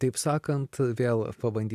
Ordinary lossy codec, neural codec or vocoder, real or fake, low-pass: AAC, 96 kbps; none; real; 14.4 kHz